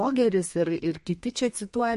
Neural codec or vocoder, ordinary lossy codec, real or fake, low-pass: codec, 32 kHz, 1.9 kbps, SNAC; MP3, 48 kbps; fake; 14.4 kHz